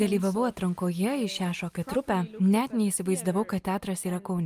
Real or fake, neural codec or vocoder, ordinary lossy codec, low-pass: real; none; Opus, 32 kbps; 14.4 kHz